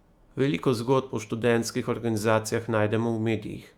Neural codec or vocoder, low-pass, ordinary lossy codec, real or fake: autoencoder, 48 kHz, 128 numbers a frame, DAC-VAE, trained on Japanese speech; 19.8 kHz; Opus, 64 kbps; fake